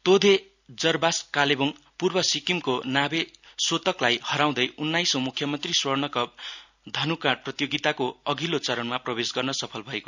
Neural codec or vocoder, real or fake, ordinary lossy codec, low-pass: none; real; none; 7.2 kHz